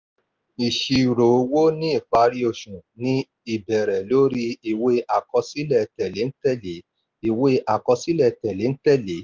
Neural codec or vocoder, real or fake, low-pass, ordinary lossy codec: none; real; 7.2 kHz; Opus, 32 kbps